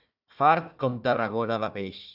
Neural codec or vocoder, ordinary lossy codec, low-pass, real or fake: codec, 16 kHz, 1 kbps, FunCodec, trained on Chinese and English, 50 frames a second; AAC, 48 kbps; 5.4 kHz; fake